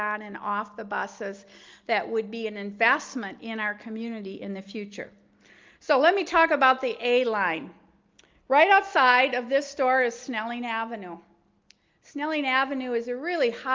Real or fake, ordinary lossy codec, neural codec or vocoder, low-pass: real; Opus, 24 kbps; none; 7.2 kHz